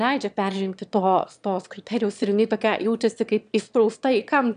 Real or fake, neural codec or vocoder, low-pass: fake; autoencoder, 22.05 kHz, a latent of 192 numbers a frame, VITS, trained on one speaker; 9.9 kHz